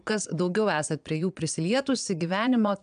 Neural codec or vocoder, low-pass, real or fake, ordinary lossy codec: vocoder, 22.05 kHz, 80 mel bands, Vocos; 9.9 kHz; fake; AAC, 96 kbps